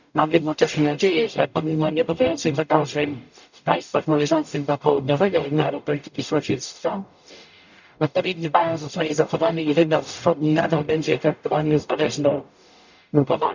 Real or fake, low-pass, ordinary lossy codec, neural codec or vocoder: fake; 7.2 kHz; none; codec, 44.1 kHz, 0.9 kbps, DAC